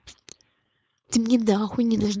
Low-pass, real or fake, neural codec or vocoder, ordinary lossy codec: none; fake; codec, 16 kHz, 4.8 kbps, FACodec; none